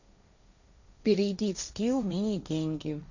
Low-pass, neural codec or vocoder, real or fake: 7.2 kHz; codec, 16 kHz, 1.1 kbps, Voila-Tokenizer; fake